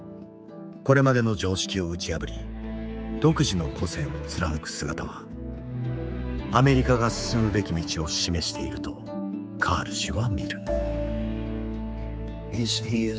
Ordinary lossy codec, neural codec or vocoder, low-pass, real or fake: none; codec, 16 kHz, 4 kbps, X-Codec, HuBERT features, trained on general audio; none; fake